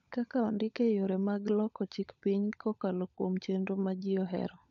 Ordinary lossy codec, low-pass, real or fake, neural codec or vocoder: none; 7.2 kHz; fake; codec, 16 kHz, 16 kbps, FunCodec, trained on LibriTTS, 50 frames a second